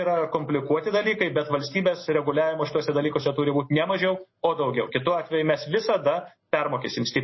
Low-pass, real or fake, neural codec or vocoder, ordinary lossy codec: 7.2 kHz; real; none; MP3, 24 kbps